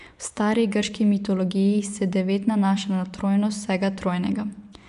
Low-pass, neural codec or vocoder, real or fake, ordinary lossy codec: 10.8 kHz; vocoder, 24 kHz, 100 mel bands, Vocos; fake; none